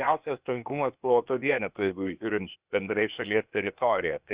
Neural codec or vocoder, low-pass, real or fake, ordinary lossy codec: codec, 16 kHz, 0.8 kbps, ZipCodec; 3.6 kHz; fake; Opus, 64 kbps